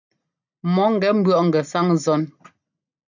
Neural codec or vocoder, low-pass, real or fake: none; 7.2 kHz; real